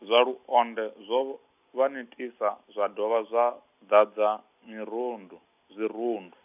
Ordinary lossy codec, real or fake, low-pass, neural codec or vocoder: none; real; 3.6 kHz; none